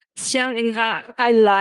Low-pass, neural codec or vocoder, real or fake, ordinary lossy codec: 10.8 kHz; codec, 16 kHz in and 24 kHz out, 0.4 kbps, LongCat-Audio-Codec, four codebook decoder; fake; Opus, 32 kbps